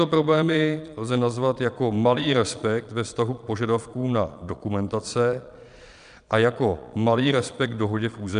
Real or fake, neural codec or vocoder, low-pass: fake; vocoder, 22.05 kHz, 80 mel bands, Vocos; 9.9 kHz